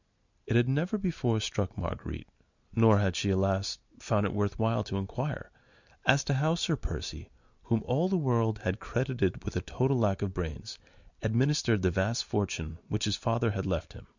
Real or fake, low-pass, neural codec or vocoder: real; 7.2 kHz; none